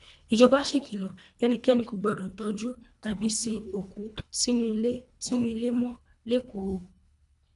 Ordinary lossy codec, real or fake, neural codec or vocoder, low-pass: none; fake; codec, 24 kHz, 1.5 kbps, HILCodec; 10.8 kHz